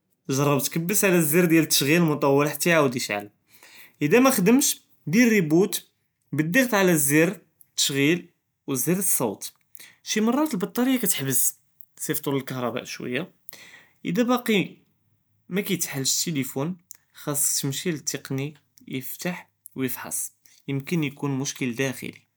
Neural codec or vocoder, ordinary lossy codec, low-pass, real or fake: none; none; none; real